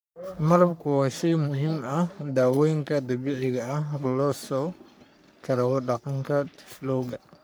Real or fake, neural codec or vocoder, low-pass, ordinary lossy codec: fake; codec, 44.1 kHz, 3.4 kbps, Pupu-Codec; none; none